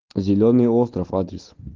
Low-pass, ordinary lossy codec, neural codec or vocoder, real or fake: 7.2 kHz; Opus, 16 kbps; none; real